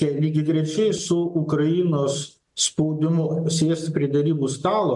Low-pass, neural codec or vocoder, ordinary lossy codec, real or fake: 10.8 kHz; none; MP3, 64 kbps; real